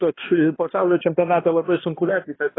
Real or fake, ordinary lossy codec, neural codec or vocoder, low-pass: fake; AAC, 16 kbps; codec, 16 kHz, 1 kbps, X-Codec, HuBERT features, trained on balanced general audio; 7.2 kHz